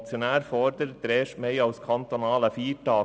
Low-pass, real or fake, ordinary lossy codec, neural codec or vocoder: none; real; none; none